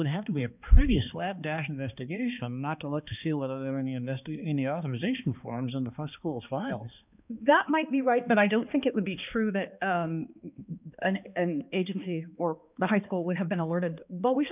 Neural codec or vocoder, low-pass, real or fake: codec, 16 kHz, 2 kbps, X-Codec, HuBERT features, trained on balanced general audio; 3.6 kHz; fake